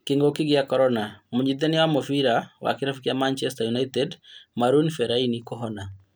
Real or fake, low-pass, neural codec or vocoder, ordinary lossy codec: real; none; none; none